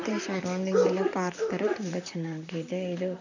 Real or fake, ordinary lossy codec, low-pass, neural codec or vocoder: fake; none; 7.2 kHz; vocoder, 44.1 kHz, 128 mel bands, Pupu-Vocoder